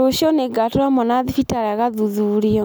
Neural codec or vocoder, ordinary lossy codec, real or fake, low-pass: none; none; real; none